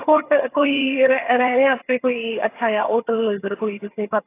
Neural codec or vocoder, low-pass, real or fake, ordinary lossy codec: vocoder, 22.05 kHz, 80 mel bands, HiFi-GAN; 3.6 kHz; fake; AAC, 24 kbps